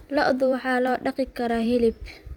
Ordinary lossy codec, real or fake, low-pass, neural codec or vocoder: none; fake; 19.8 kHz; vocoder, 44.1 kHz, 128 mel bands every 256 samples, BigVGAN v2